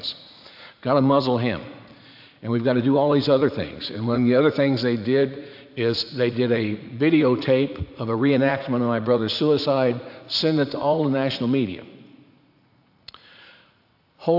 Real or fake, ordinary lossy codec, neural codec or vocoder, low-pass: fake; AAC, 48 kbps; vocoder, 44.1 kHz, 80 mel bands, Vocos; 5.4 kHz